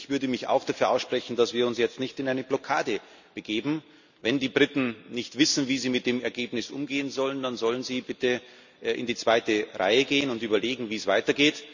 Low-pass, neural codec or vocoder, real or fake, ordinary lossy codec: 7.2 kHz; none; real; none